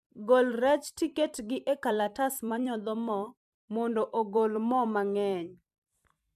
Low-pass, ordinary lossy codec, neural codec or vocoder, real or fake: 14.4 kHz; MP3, 96 kbps; vocoder, 44.1 kHz, 128 mel bands every 256 samples, BigVGAN v2; fake